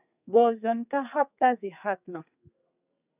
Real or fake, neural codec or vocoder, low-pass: fake; codec, 32 kHz, 1.9 kbps, SNAC; 3.6 kHz